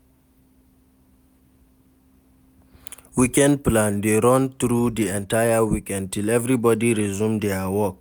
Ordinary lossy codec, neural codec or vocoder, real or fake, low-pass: none; none; real; none